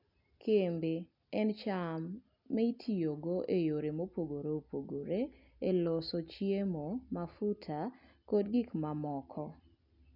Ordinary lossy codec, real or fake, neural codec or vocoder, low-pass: none; real; none; 5.4 kHz